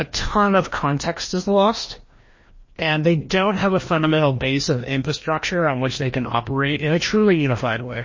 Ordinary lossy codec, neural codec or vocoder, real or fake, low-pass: MP3, 32 kbps; codec, 16 kHz, 1 kbps, FreqCodec, larger model; fake; 7.2 kHz